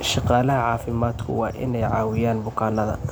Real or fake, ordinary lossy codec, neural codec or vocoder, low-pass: real; none; none; none